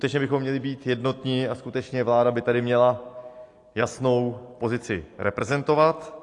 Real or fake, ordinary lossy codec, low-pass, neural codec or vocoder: real; AAC, 48 kbps; 10.8 kHz; none